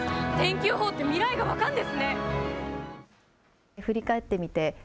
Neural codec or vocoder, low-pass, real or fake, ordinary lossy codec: none; none; real; none